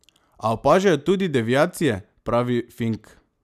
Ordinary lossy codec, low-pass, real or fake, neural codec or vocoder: none; 14.4 kHz; real; none